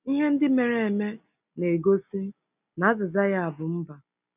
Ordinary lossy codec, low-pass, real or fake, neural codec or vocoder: none; 3.6 kHz; real; none